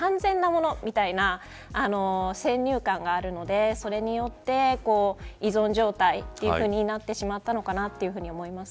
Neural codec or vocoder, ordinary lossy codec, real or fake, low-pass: none; none; real; none